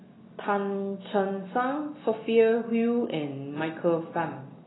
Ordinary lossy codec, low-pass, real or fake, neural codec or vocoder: AAC, 16 kbps; 7.2 kHz; real; none